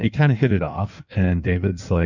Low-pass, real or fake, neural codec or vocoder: 7.2 kHz; fake; codec, 16 kHz in and 24 kHz out, 1.1 kbps, FireRedTTS-2 codec